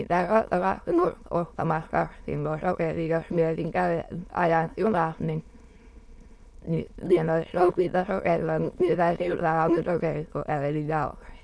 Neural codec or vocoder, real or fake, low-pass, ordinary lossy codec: autoencoder, 22.05 kHz, a latent of 192 numbers a frame, VITS, trained on many speakers; fake; none; none